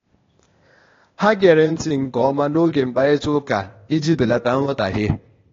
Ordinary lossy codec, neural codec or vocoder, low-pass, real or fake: AAC, 32 kbps; codec, 16 kHz, 0.8 kbps, ZipCodec; 7.2 kHz; fake